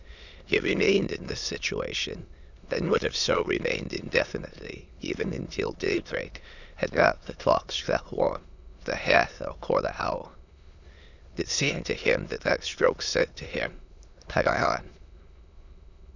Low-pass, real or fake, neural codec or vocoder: 7.2 kHz; fake; autoencoder, 22.05 kHz, a latent of 192 numbers a frame, VITS, trained on many speakers